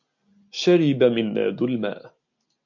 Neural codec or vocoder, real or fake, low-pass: none; real; 7.2 kHz